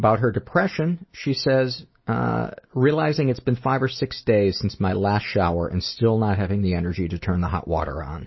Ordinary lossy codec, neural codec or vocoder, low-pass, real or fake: MP3, 24 kbps; none; 7.2 kHz; real